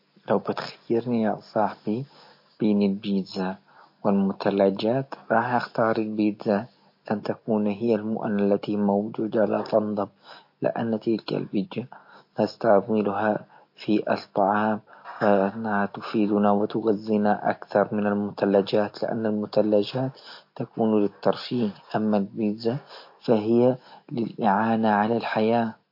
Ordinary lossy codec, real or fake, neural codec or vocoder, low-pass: MP3, 32 kbps; real; none; 5.4 kHz